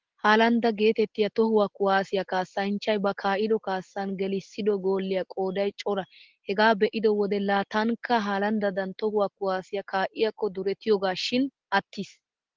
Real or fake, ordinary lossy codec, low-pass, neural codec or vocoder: real; Opus, 16 kbps; 7.2 kHz; none